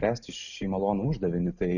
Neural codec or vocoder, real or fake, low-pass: none; real; 7.2 kHz